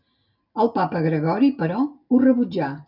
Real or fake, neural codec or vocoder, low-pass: real; none; 5.4 kHz